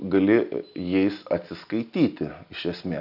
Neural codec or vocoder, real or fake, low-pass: none; real; 5.4 kHz